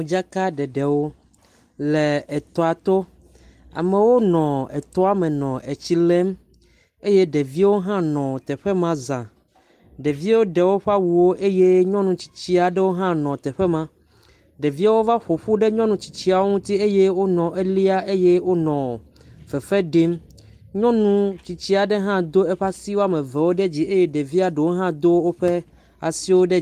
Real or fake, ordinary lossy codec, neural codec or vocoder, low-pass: real; Opus, 32 kbps; none; 14.4 kHz